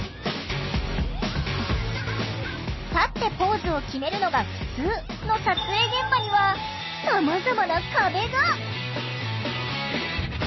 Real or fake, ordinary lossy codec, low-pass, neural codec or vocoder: real; MP3, 24 kbps; 7.2 kHz; none